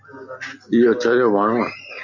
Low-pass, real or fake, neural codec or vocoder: 7.2 kHz; real; none